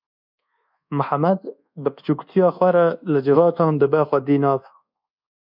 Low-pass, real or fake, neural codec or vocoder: 5.4 kHz; fake; codec, 24 kHz, 1.2 kbps, DualCodec